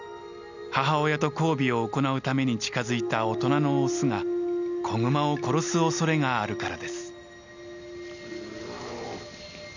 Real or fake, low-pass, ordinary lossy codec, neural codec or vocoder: real; 7.2 kHz; none; none